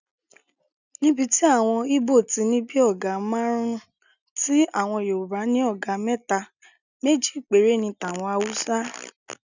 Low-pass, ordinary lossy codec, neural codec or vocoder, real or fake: 7.2 kHz; none; none; real